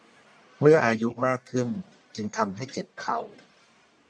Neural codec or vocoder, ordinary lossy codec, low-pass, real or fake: codec, 44.1 kHz, 1.7 kbps, Pupu-Codec; none; 9.9 kHz; fake